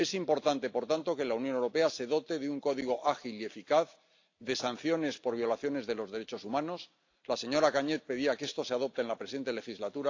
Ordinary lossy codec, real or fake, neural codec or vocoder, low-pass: AAC, 48 kbps; real; none; 7.2 kHz